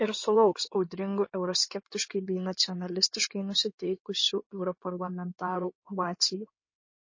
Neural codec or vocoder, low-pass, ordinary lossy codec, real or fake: codec, 16 kHz in and 24 kHz out, 2.2 kbps, FireRedTTS-2 codec; 7.2 kHz; MP3, 32 kbps; fake